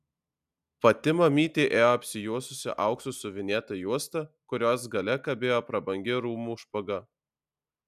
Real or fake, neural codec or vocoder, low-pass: real; none; 14.4 kHz